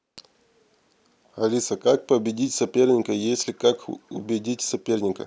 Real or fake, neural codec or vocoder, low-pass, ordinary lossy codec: real; none; none; none